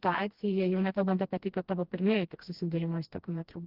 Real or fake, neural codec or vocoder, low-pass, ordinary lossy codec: fake; codec, 16 kHz, 1 kbps, FreqCodec, smaller model; 5.4 kHz; Opus, 16 kbps